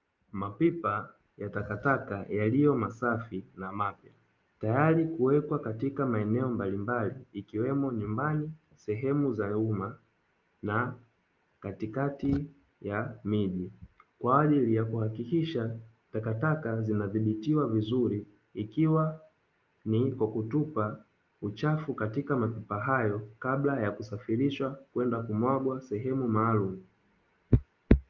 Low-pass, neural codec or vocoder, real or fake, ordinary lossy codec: 7.2 kHz; none; real; Opus, 24 kbps